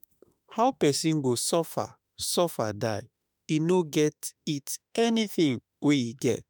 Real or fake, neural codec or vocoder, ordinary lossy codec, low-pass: fake; autoencoder, 48 kHz, 32 numbers a frame, DAC-VAE, trained on Japanese speech; none; none